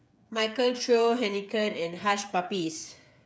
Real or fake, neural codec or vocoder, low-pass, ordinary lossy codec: fake; codec, 16 kHz, 8 kbps, FreqCodec, smaller model; none; none